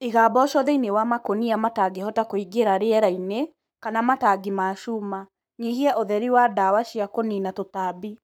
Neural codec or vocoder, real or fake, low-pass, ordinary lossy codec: codec, 44.1 kHz, 7.8 kbps, Pupu-Codec; fake; none; none